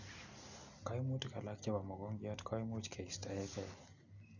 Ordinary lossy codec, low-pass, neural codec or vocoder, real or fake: none; 7.2 kHz; none; real